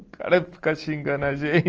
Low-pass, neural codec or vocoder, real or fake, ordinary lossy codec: 7.2 kHz; none; real; Opus, 24 kbps